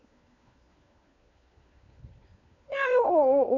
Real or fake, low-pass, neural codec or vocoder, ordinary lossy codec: fake; 7.2 kHz; codec, 16 kHz, 4 kbps, FunCodec, trained on LibriTTS, 50 frames a second; none